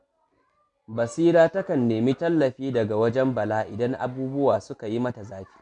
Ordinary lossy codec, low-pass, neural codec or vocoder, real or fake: none; 10.8 kHz; none; real